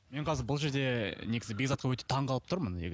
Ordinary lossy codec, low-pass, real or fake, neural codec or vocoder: none; none; real; none